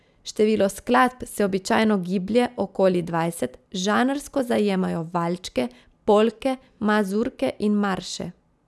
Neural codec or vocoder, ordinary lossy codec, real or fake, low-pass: none; none; real; none